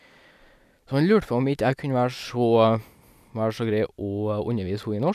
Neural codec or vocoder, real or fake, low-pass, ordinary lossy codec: none; real; 14.4 kHz; none